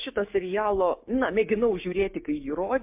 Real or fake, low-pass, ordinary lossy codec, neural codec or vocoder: fake; 3.6 kHz; MP3, 32 kbps; codec, 16 kHz, 6 kbps, DAC